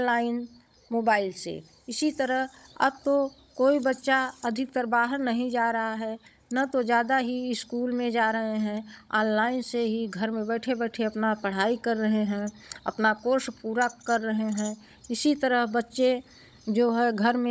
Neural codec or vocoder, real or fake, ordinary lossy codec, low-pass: codec, 16 kHz, 16 kbps, FunCodec, trained on Chinese and English, 50 frames a second; fake; none; none